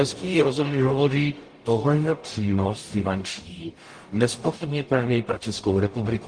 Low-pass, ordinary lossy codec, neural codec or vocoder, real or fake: 9.9 kHz; Opus, 24 kbps; codec, 44.1 kHz, 0.9 kbps, DAC; fake